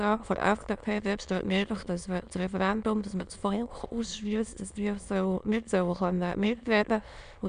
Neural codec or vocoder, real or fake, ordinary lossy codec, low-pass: autoencoder, 22.05 kHz, a latent of 192 numbers a frame, VITS, trained on many speakers; fake; none; 9.9 kHz